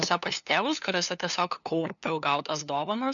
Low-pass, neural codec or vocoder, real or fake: 7.2 kHz; codec, 16 kHz, 2 kbps, FunCodec, trained on LibriTTS, 25 frames a second; fake